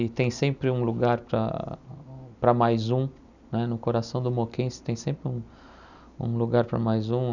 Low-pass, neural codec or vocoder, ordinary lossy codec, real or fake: 7.2 kHz; none; none; real